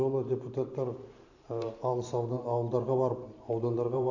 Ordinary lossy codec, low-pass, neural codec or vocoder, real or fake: none; 7.2 kHz; vocoder, 44.1 kHz, 128 mel bands every 512 samples, BigVGAN v2; fake